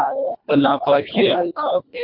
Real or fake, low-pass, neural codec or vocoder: fake; 5.4 kHz; codec, 24 kHz, 1.5 kbps, HILCodec